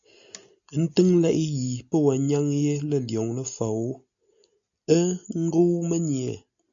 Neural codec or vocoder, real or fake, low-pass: none; real; 7.2 kHz